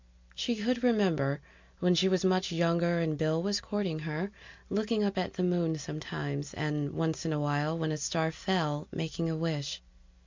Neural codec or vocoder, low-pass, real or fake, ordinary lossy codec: none; 7.2 kHz; real; MP3, 64 kbps